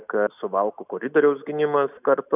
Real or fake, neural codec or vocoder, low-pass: real; none; 3.6 kHz